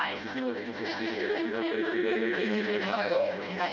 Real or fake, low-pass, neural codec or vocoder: fake; 7.2 kHz; codec, 16 kHz, 0.5 kbps, FreqCodec, smaller model